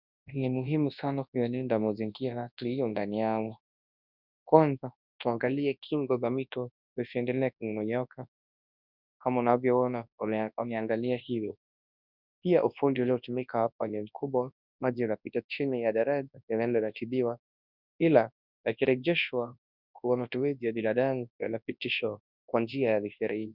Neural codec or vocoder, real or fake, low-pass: codec, 24 kHz, 0.9 kbps, WavTokenizer, large speech release; fake; 5.4 kHz